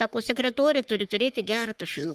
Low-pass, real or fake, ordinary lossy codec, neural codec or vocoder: 14.4 kHz; fake; Opus, 32 kbps; codec, 44.1 kHz, 3.4 kbps, Pupu-Codec